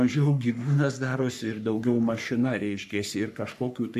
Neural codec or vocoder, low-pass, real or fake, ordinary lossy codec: codec, 44.1 kHz, 3.4 kbps, Pupu-Codec; 14.4 kHz; fake; AAC, 96 kbps